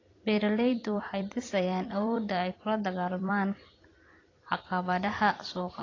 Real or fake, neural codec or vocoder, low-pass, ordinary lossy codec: fake; vocoder, 44.1 kHz, 128 mel bands every 256 samples, BigVGAN v2; 7.2 kHz; AAC, 32 kbps